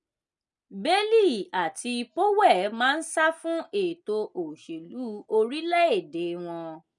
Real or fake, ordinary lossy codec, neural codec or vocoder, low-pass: real; none; none; 10.8 kHz